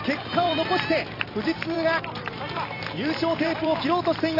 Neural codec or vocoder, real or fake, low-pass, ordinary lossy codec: vocoder, 44.1 kHz, 128 mel bands every 256 samples, BigVGAN v2; fake; 5.4 kHz; AAC, 24 kbps